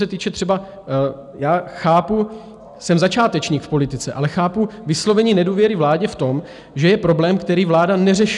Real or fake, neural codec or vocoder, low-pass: real; none; 10.8 kHz